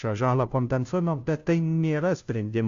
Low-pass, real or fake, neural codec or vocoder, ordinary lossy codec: 7.2 kHz; fake; codec, 16 kHz, 0.5 kbps, FunCodec, trained on Chinese and English, 25 frames a second; Opus, 64 kbps